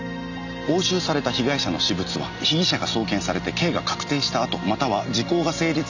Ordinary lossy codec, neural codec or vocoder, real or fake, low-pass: none; none; real; 7.2 kHz